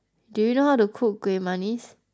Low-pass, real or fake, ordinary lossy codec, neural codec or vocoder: none; real; none; none